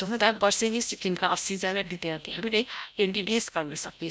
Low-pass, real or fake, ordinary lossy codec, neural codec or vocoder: none; fake; none; codec, 16 kHz, 0.5 kbps, FreqCodec, larger model